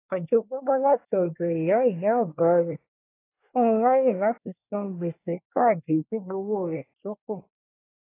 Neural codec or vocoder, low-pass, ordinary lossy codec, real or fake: codec, 24 kHz, 1 kbps, SNAC; 3.6 kHz; AAC, 24 kbps; fake